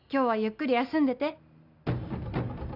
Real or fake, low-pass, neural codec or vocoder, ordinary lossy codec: real; 5.4 kHz; none; none